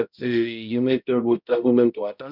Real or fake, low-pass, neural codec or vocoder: fake; 5.4 kHz; codec, 16 kHz, 0.5 kbps, X-Codec, HuBERT features, trained on balanced general audio